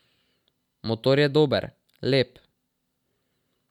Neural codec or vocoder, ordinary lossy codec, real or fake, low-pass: none; none; real; 19.8 kHz